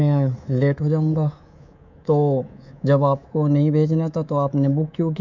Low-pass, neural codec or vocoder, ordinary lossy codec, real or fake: 7.2 kHz; codec, 24 kHz, 3.1 kbps, DualCodec; none; fake